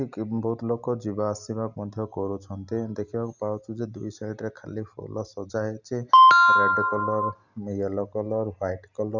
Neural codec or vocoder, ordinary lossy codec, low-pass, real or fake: none; none; 7.2 kHz; real